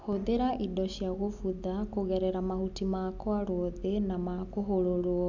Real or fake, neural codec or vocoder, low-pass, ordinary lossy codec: real; none; 7.2 kHz; none